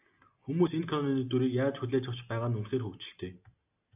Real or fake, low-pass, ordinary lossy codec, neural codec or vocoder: real; 3.6 kHz; AAC, 32 kbps; none